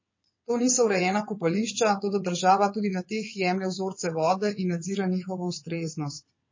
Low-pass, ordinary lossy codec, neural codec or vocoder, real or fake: 7.2 kHz; MP3, 32 kbps; vocoder, 22.05 kHz, 80 mel bands, WaveNeXt; fake